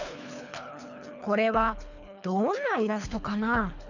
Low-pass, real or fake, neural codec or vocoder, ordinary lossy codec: 7.2 kHz; fake; codec, 24 kHz, 3 kbps, HILCodec; none